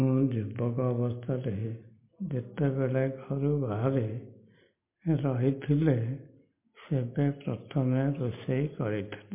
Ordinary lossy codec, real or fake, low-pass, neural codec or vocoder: MP3, 24 kbps; real; 3.6 kHz; none